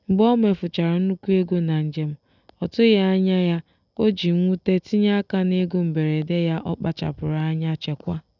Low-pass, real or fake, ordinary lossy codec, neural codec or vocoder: 7.2 kHz; real; none; none